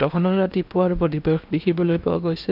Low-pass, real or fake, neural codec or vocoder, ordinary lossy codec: 5.4 kHz; fake; codec, 16 kHz in and 24 kHz out, 0.8 kbps, FocalCodec, streaming, 65536 codes; none